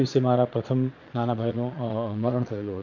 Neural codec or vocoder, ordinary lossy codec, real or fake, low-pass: vocoder, 22.05 kHz, 80 mel bands, Vocos; none; fake; 7.2 kHz